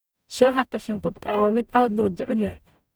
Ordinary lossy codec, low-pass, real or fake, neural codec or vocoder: none; none; fake; codec, 44.1 kHz, 0.9 kbps, DAC